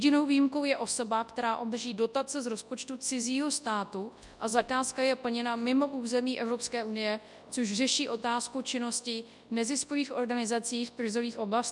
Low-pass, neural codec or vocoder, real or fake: 10.8 kHz; codec, 24 kHz, 0.9 kbps, WavTokenizer, large speech release; fake